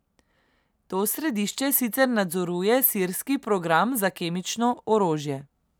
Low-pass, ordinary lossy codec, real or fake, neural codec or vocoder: none; none; real; none